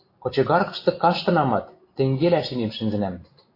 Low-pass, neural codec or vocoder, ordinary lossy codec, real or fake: 5.4 kHz; none; AAC, 32 kbps; real